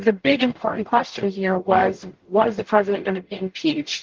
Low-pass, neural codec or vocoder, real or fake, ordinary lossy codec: 7.2 kHz; codec, 44.1 kHz, 0.9 kbps, DAC; fake; Opus, 16 kbps